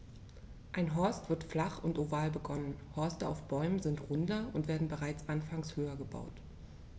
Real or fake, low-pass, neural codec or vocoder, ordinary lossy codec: real; none; none; none